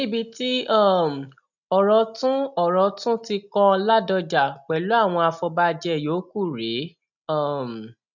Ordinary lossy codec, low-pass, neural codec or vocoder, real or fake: none; 7.2 kHz; none; real